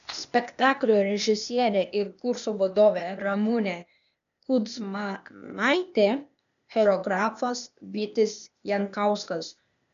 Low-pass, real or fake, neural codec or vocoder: 7.2 kHz; fake; codec, 16 kHz, 0.8 kbps, ZipCodec